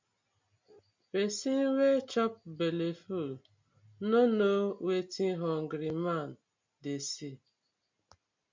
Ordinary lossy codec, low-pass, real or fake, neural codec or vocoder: MP3, 64 kbps; 7.2 kHz; real; none